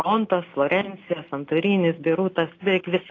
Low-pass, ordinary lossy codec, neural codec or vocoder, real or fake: 7.2 kHz; AAC, 32 kbps; none; real